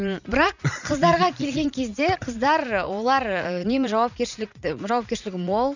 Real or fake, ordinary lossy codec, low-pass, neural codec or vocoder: fake; none; 7.2 kHz; vocoder, 44.1 kHz, 80 mel bands, Vocos